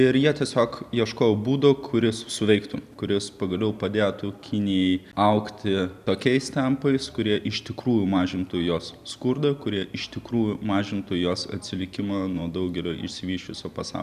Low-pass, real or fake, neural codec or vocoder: 14.4 kHz; real; none